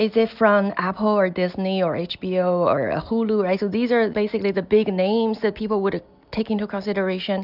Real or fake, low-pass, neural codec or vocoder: real; 5.4 kHz; none